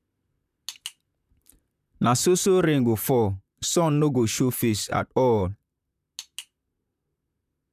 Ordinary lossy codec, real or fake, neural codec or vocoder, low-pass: none; fake; vocoder, 44.1 kHz, 128 mel bands, Pupu-Vocoder; 14.4 kHz